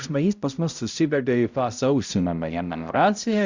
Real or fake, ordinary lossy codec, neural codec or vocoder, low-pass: fake; Opus, 64 kbps; codec, 16 kHz, 0.5 kbps, X-Codec, HuBERT features, trained on balanced general audio; 7.2 kHz